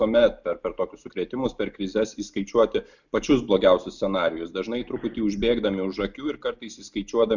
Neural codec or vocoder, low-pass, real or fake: none; 7.2 kHz; real